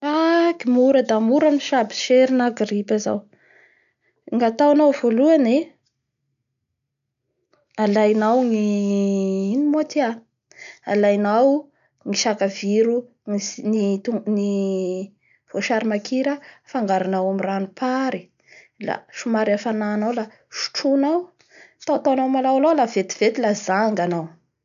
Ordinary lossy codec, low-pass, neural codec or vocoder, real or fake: none; 7.2 kHz; none; real